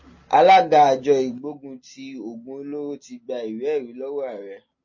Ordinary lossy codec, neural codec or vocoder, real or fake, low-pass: MP3, 32 kbps; none; real; 7.2 kHz